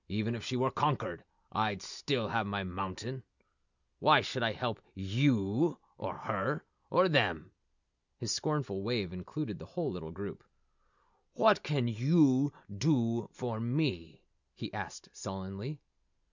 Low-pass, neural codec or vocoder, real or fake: 7.2 kHz; none; real